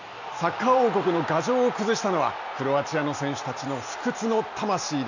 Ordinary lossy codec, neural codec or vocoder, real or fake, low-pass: none; none; real; 7.2 kHz